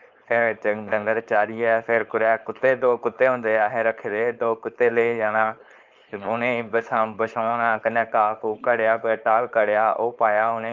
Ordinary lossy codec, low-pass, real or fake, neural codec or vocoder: Opus, 32 kbps; 7.2 kHz; fake; codec, 16 kHz, 4.8 kbps, FACodec